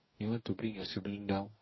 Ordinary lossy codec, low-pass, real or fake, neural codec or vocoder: MP3, 24 kbps; 7.2 kHz; fake; codec, 44.1 kHz, 2.6 kbps, DAC